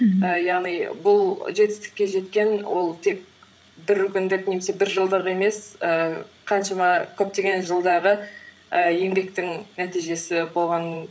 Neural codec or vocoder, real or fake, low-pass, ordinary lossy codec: codec, 16 kHz, 8 kbps, FreqCodec, larger model; fake; none; none